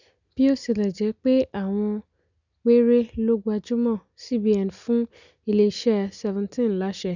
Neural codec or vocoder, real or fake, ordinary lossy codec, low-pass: none; real; none; 7.2 kHz